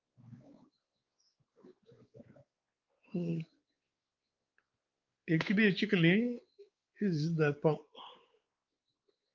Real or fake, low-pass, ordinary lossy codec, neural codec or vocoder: fake; 7.2 kHz; Opus, 32 kbps; codec, 16 kHz, 4 kbps, X-Codec, WavLM features, trained on Multilingual LibriSpeech